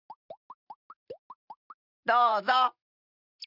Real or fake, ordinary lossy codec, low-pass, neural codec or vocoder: fake; none; 5.4 kHz; vocoder, 44.1 kHz, 128 mel bands every 512 samples, BigVGAN v2